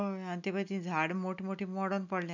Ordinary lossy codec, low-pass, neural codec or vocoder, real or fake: none; 7.2 kHz; none; real